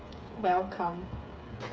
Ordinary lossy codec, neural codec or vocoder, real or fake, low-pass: none; codec, 16 kHz, 8 kbps, FreqCodec, smaller model; fake; none